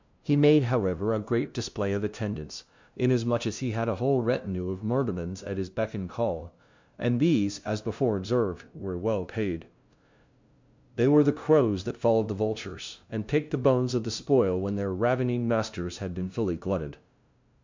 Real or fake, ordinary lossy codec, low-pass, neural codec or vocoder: fake; MP3, 64 kbps; 7.2 kHz; codec, 16 kHz, 0.5 kbps, FunCodec, trained on LibriTTS, 25 frames a second